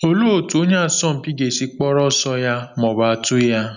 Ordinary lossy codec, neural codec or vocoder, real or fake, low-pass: none; none; real; 7.2 kHz